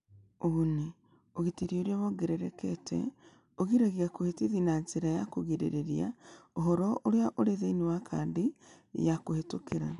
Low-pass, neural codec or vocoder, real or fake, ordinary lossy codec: 10.8 kHz; none; real; none